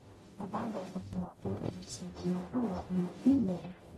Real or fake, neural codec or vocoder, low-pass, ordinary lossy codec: fake; codec, 44.1 kHz, 0.9 kbps, DAC; 19.8 kHz; AAC, 32 kbps